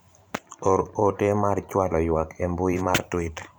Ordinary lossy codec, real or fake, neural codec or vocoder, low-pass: none; fake; vocoder, 44.1 kHz, 128 mel bands every 512 samples, BigVGAN v2; none